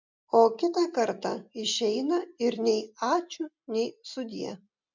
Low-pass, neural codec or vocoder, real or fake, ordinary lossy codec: 7.2 kHz; none; real; MP3, 64 kbps